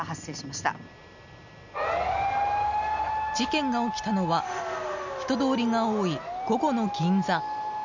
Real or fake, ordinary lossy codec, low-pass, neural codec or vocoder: real; none; 7.2 kHz; none